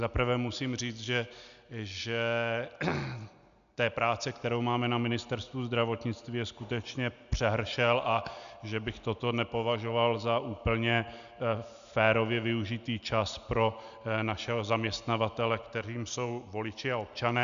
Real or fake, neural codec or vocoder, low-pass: real; none; 7.2 kHz